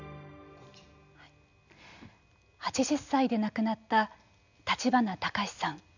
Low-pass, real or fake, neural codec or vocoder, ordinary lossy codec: 7.2 kHz; real; none; none